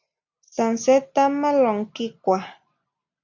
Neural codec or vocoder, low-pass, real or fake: none; 7.2 kHz; real